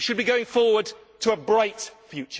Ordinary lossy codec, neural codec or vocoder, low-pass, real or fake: none; none; none; real